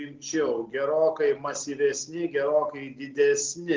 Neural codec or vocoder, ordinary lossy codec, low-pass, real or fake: none; Opus, 32 kbps; 7.2 kHz; real